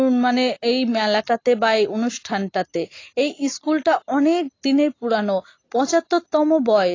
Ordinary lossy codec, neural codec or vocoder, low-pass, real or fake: AAC, 32 kbps; none; 7.2 kHz; real